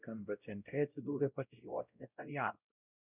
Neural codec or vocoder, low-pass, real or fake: codec, 16 kHz, 0.5 kbps, X-Codec, WavLM features, trained on Multilingual LibriSpeech; 3.6 kHz; fake